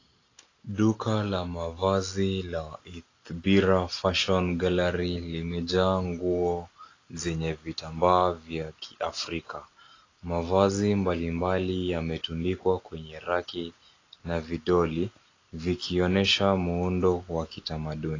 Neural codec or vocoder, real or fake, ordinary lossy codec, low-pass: none; real; AAC, 32 kbps; 7.2 kHz